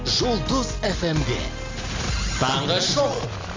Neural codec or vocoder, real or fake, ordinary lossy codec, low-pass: none; real; AAC, 32 kbps; 7.2 kHz